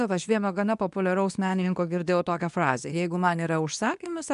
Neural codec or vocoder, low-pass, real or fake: codec, 24 kHz, 0.9 kbps, WavTokenizer, medium speech release version 2; 10.8 kHz; fake